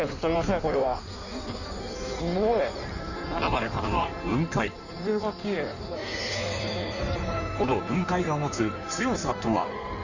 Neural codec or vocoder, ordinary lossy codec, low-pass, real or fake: codec, 16 kHz in and 24 kHz out, 1.1 kbps, FireRedTTS-2 codec; none; 7.2 kHz; fake